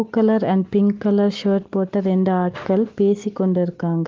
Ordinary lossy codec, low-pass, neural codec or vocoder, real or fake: Opus, 16 kbps; 7.2 kHz; none; real